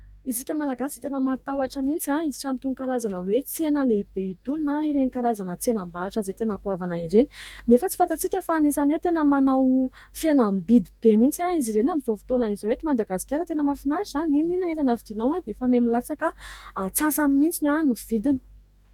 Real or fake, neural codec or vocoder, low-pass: fake; codec, 44.1 kHz, 2.6 kbps, DAC; 19.8 kHz